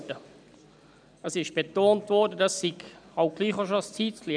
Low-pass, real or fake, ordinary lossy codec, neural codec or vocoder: 9.9 kHz; real; none; none